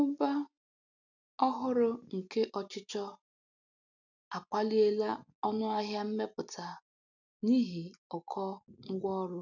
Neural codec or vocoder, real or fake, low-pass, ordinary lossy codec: none; real; 7.2 kHz; none